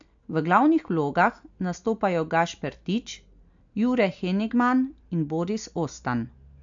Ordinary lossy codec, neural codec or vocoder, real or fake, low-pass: AAC, 64 kbps; none; real; 7.2 kHz